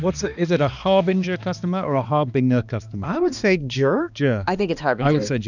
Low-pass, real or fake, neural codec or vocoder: 7.2 kHz; fake; codec, 16 kHz, 2 kbps, X-Codec, HuBERT features, trained on balanced general audio